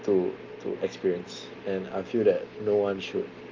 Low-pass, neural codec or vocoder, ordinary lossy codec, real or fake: 7.2 kHz; none; Opus, 24 kbps; real